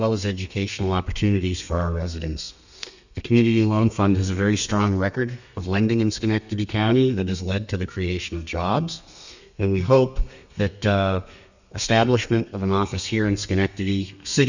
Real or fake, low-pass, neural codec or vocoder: fake; 7.2 kHz; codec, 32 kHz, 1.9 kbps, SNAC